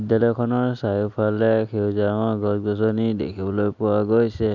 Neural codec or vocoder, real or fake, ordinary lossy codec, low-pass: none; real; none; 7.2 kHz